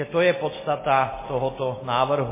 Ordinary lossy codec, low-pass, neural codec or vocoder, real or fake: MP3, 16 kbps; 3.6 kHz; none; real